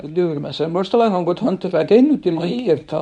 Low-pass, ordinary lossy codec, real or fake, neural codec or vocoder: 10.8 kHz; none; fake; codec, 24 kHz, 0.9 kbps, WavTokenizer, medium speech release version 1